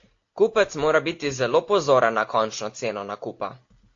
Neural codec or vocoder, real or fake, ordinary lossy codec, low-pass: none; real; AAC, 48 kbps; 7.2 kHz